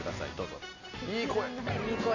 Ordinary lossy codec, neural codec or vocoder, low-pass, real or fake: none; none; 7.2 kHz; real